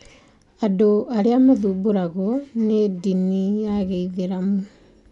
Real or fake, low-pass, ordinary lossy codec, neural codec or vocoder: real; 10.8 kHz; none; none